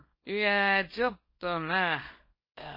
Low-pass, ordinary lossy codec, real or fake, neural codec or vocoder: 5.4 kHz; MP3, 24 kbps; fake; codec, 24 kHz, 0.9 kbps, WavTokenizer, small release